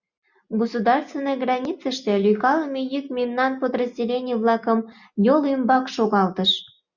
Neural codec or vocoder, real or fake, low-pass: none; real; 7.2 kHz